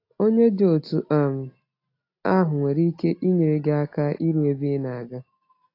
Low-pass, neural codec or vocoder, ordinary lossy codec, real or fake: 5.4 kHz; none; AAC, 32 kbps; real